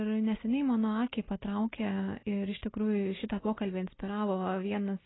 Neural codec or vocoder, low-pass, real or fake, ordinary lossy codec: none; 7.2 kHz; real; AAC, 16 kbps